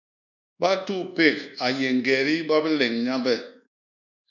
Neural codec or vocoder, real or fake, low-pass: codec, 24 kHz, 1.2 kbps, DualCodec; fake; 7.2 kHz